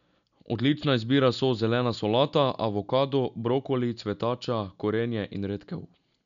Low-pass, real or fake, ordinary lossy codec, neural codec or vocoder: 7.2 kHz; real; none; none